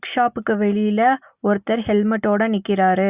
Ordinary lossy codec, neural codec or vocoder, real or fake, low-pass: Opus, 64 kbps; none; real; 3.6 kHz